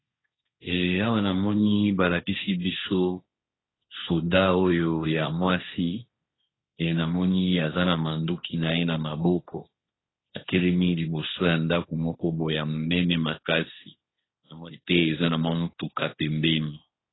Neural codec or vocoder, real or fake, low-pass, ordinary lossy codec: codec, 16 kHz, 1.1 kbps, Voila-Tokenizer; fake; 7.2 kHz; AAC, 16 kbps